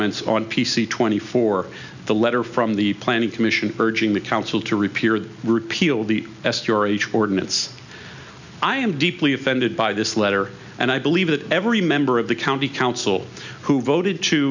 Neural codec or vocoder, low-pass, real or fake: none; 7.2 kHz; real